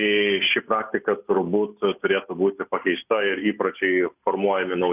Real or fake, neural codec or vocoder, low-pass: real; none; 3.6 kHz